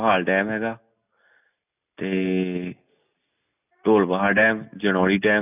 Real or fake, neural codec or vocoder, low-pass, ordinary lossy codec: real; none; 3.6 kHz; none